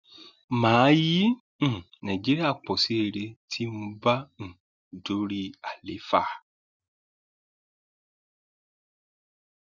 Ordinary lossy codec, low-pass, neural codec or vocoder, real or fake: none; 7.2 kHz; none; real